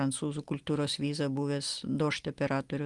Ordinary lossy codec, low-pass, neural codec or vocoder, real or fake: Opus, 32 kbps; 10.8 kHz; none; real